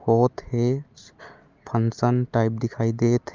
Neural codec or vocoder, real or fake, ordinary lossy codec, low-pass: none; real; Opus, 24 kbps; 7.2 kHz